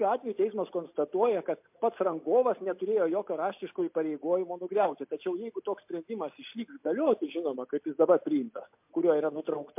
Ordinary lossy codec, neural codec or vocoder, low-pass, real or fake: MP3, 32 kbps; none; 3.6 kHz; real